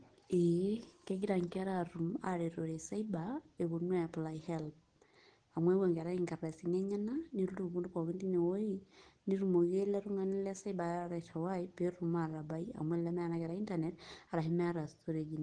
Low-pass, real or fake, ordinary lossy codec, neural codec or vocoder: 9.9 kHz; real; Opus, 16 kbps; none